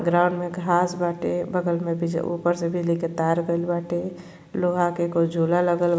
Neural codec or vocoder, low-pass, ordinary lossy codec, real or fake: none; none; none; real